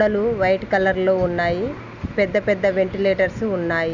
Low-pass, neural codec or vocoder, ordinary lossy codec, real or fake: 7.2 kHz; none; none; real